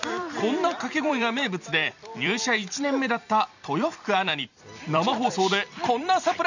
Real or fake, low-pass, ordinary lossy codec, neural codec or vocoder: fake; 7.2 kHz; none; vocoder, 44.1 kHz, 128 mel bands every 256 samples, BigVGAN v2